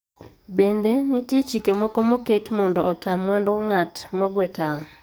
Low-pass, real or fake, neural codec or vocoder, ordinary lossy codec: none; fake; codec, 44.1 kHz, 2.6 kbps, SNAC; none